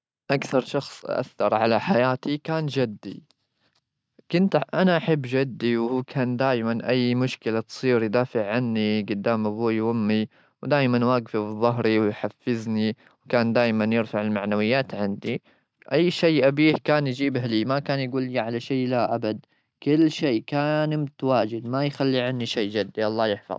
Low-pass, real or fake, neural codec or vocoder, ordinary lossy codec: none; real; none; none